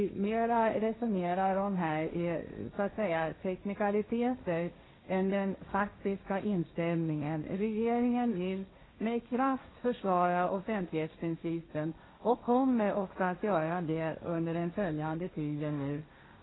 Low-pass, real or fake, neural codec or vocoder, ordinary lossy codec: 7.2 kHz; fake; codec, 16 kHz, 1.1 kbps, Voila-Tokenizer; AAC, 16 kbps